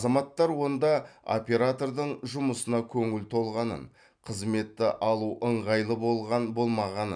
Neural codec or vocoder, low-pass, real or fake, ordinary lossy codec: none; 9.9 kHz; real; none